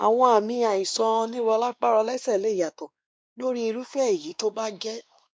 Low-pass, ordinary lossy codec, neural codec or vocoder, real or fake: none; none; codec, 16 kHz, 2 kbps, X-Codec, WavLM features, trained on Multilingual LibriSpeech; fake